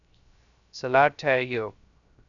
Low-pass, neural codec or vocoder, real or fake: 7.2 kHz; codec, 16 kHz, 0.3 kbps, FocalCodec; fake